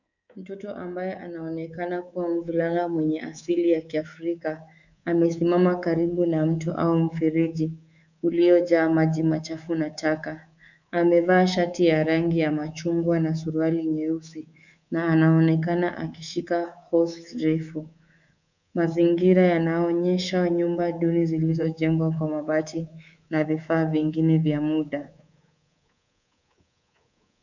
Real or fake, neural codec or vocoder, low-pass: fake; codec, 24 kHz, 3.1 kbps, DualCodec; 7.2 kHz